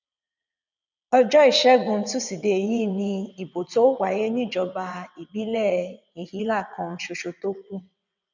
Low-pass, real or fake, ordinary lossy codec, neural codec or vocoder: 7.2 kHz; fake; none; vocoder, 22.05 kHz, 80 mel bands, WaveNeXt